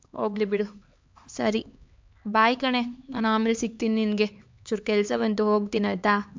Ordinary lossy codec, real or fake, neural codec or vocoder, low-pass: MP3, 64 kbps; fake; codec, 16 kHz, 4 kbps, X-Codec, HuBERT features, trained on LibriSpeech; 7.2 kHz